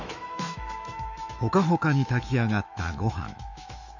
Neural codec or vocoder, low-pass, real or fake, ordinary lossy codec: autoencoder, 48 kHz, 128 numbers a frame, DAC-VAE, trained on Japanese speech; 7.2 kHz; fake; none